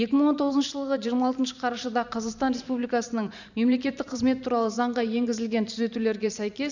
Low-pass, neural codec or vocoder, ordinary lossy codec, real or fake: 7.2 kHz; vocoder, 44.1 kHz, 128 mel bands every 512 samples, BigVGAN v2; none; fake